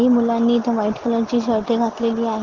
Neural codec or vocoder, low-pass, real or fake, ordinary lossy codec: none; 7.2 kHz; real; Opus, 16 kbps